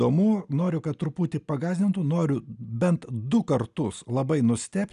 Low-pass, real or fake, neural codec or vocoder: 10.8 kHz; real; none